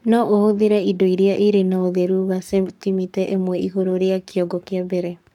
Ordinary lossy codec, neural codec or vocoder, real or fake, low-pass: none; codec, 44.1 kHz, 7.8 kbps, Pupu-Codec; fake; 19.8 kHz